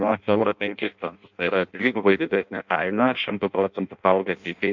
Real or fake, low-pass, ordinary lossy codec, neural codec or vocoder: fake; 7.2 kHz; MP3, 64 kbps; codec, 16 kHz in and 24 kHz out, 0.6 kbps, FireRedTTS-2 codec